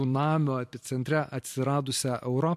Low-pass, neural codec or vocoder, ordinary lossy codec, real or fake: 14.4 kHz; vocoder, 44.1 kHz, 128 mel bands every 256 samples, BigVGAN v2; MP3, 64 kbps; fake